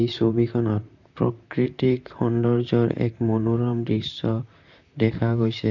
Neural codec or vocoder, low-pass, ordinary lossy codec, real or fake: vocoder, 44.1 kHz, 128 mel bands, Pupu-Vocoder; 7.2 kHz; none; fake